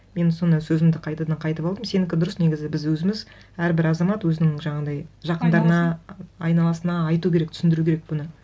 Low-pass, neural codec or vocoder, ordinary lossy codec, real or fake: none; none; none; real